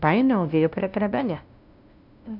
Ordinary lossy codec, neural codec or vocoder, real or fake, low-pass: none; codec, 16 kHz, 0.5 kbps, FunCodec, trained on LibriTTS, 25 frames a second; fake; 5.4 kHz